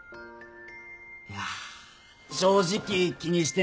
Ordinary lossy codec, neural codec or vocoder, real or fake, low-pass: none; none; real; none